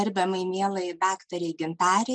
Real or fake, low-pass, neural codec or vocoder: real; 9.9 kHz; none